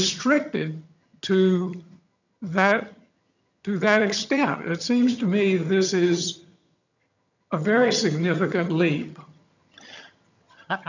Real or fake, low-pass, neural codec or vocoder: fake; 7.2 kHz; vocoder, 22.05 kHz, 80 mel bands, HiFi-GAN